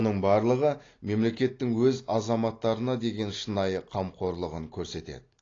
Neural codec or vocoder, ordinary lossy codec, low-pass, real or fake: none; AAC, 32 kbps; 7.2 kHz; real